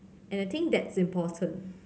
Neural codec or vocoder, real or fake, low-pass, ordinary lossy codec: none; real; none; none